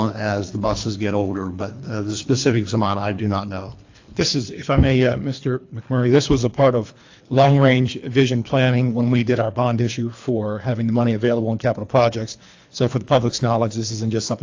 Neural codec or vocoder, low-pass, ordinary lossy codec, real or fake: codec, 24 kHz, 3 kbps, HILCodec; 7.2 kHz; AAC, 48 kbps; fake